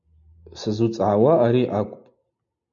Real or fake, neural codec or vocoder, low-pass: real; none; 7.2 kHz